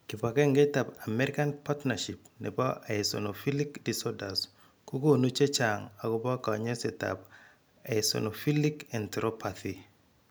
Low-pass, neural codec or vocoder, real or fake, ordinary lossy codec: none; none; real; none